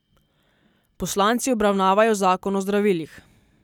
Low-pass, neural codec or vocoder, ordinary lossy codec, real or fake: 19.8 kHz; none; none; real